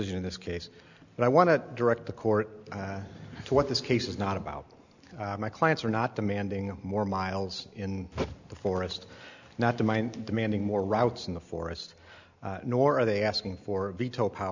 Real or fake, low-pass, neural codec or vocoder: real; 7.2 kHz; none